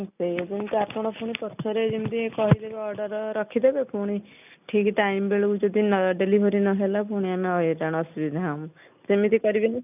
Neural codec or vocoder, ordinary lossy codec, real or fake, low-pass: none; none; real; 3.6 kHz